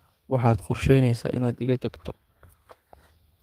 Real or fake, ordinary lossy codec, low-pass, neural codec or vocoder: fake; Opus, 32 kbps; 14.4 kHz; codec, 32 kHz, 1.9 kbps, SNAC